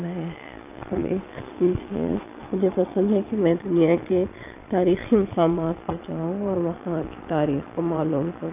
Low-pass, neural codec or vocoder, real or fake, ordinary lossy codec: 3.6 kHz; vocoder, 22.05 kHz, 80 mel bands, Vocos; fake; AAC, 32 kbps